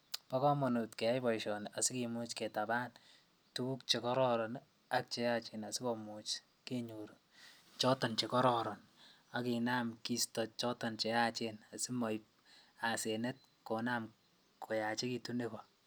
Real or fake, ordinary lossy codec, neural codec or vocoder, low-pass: real; none; none; none